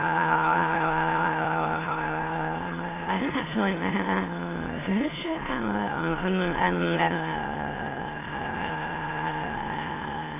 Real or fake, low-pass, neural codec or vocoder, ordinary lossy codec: fake; 3.6 kHz; autoencoder, 22.05 kHz, a latent of 192 numbers a frame, VITS, trained on many speakers; AAC, 16 kbps